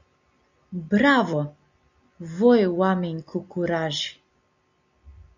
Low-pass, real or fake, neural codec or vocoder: 7.2 kHz; real; none